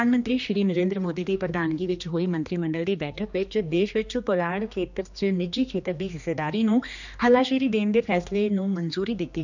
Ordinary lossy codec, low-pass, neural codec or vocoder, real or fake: none; 7.2 kHz; codec, 16 kHz, 2 kbps, X-Codec, HuBERT features, trained on general audio; fake